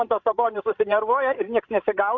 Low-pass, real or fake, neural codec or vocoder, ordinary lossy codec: 7.2 kHz; fake; codec, 16 kHz, 16 kbps, FreqCodec, larger model; AAC, 48 kbps